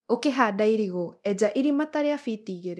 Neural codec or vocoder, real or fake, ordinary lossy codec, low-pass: codec, 24 kHz, 0.9 kbps, DualCodec; fake; none; none